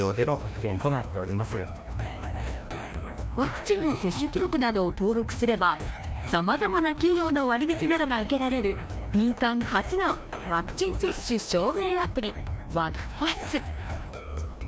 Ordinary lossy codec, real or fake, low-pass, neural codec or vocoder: none; fake; none; codec, 16 kHz, 1 kbps, FreqCodec, larger model